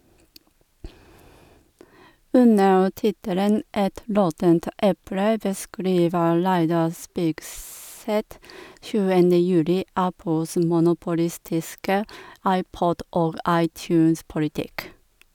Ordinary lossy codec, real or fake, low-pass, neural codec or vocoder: none; real; 19.8 kHz; none